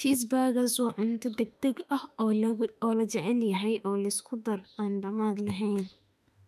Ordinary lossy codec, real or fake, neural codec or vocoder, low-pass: none; fake; autoencoder, 48 kHz, 32 numbers a frame, DAC-VAE, trained on Japanese speech; 14.4 kHz